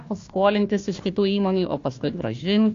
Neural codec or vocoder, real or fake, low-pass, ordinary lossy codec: codec, 16 kHz, 1 kbps, FunCodec, trained on Chinese and English, 50 frames a second; fake; 7.2 kHz; AAC, 48 kbps